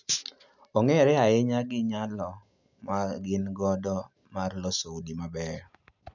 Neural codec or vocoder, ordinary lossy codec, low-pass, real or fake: none; none; 7.2 kHz; real